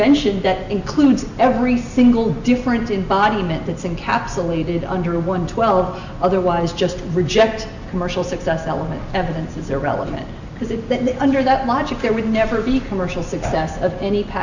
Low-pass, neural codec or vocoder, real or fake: 7.2 kHz; none; real